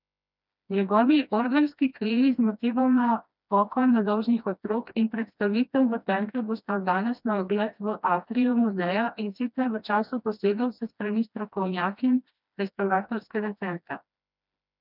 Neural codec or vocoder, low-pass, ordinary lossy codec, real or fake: codec, 16 kHz, 1 kbps, FreqCodec, smaller model; 5.4 kHz; none; fake